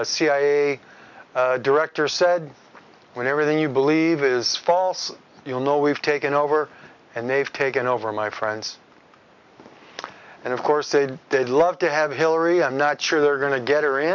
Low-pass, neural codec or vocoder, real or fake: 7.2 kHz; none; real